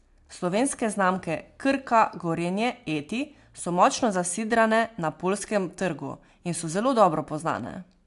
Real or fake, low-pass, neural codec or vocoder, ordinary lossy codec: real; 10.8 kHz; none; AAC, 64 kbps